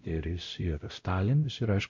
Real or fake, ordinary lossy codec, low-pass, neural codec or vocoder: fake; MP3, 48 kbps; 7.2 kHz; codec, 16 kHz, 0.5 kbps, X-Codec, WavLM features, trained on Multilingual LibriSpeech